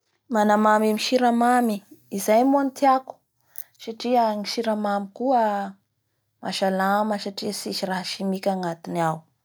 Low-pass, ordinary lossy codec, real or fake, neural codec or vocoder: none; none; real; none